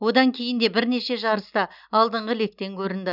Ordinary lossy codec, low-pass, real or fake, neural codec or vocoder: none; 5.4 kHz; real; none